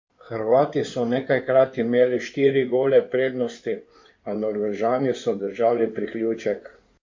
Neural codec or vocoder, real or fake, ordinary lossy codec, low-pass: codec, 16 kHz in and 24 kHz out, 2.2 kbps, FireRedTTS-2 codec; fake; MP3, 48 kbps; 7.2 kHz